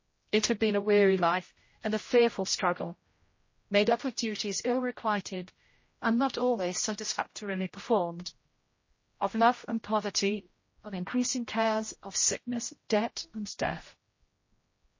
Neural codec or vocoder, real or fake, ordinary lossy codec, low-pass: codec, 16 kHz, 0.5 kbps, X-Codec, HuBERT features, trained on general audio; fake; MP3, 32 kbps; 7.2 kHz